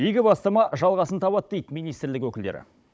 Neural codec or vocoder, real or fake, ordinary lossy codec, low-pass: codec, 16 kHz, 16 kbps, FunCodec, trained on Chinese and English, 50 frames a second; fake; none; none